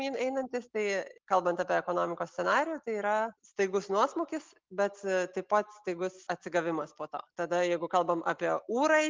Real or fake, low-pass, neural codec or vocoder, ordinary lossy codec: real; 7.2 kHz; none; Opus, 24 kbps